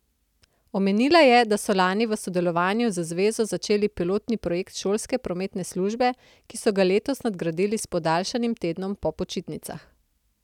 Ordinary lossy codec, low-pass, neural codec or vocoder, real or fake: none; 19.8 kHz; none; real